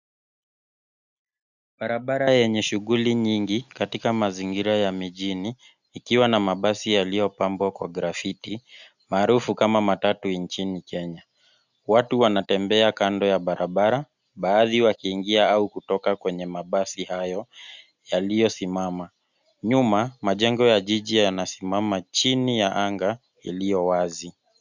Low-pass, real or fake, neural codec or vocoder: 7.2 kHz; real; none